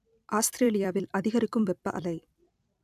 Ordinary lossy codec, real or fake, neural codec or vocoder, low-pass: none; fake; vocoder, 44.1 kHz, 128 mel bands every 256 samples, BigVGAN v2; 14.4 kHz